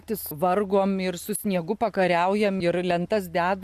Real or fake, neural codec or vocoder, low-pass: real; none; 14.4 kHz